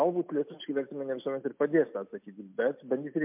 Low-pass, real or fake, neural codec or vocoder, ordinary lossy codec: 3.6 kHz; real; none; MP3, 32 kbps